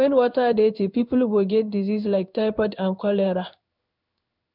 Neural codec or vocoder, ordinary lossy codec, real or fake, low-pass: codec, 16 kHz in and 24 kHz out, 1 kbps, XY-Tokenizer; none; fake; 5.4 kHz